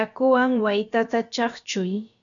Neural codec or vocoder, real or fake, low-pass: codec, 16 kHz, about 1 kbps, DyCAST, with the encoder's durations; fake; 7.2 kHz